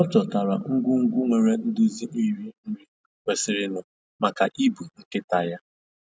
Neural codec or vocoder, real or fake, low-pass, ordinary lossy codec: none; real; none; none